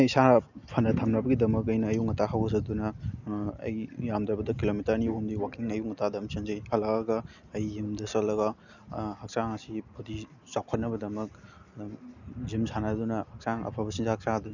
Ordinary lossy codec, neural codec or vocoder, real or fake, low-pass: none; none; real; 7.2 kHz